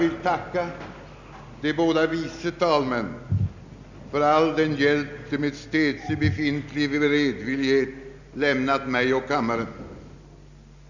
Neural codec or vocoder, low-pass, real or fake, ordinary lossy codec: none; 7.2 kHz; real; none